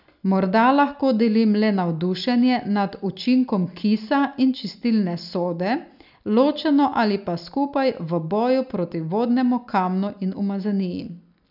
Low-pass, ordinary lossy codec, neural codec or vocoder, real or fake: 5.4 kHz; none; none; real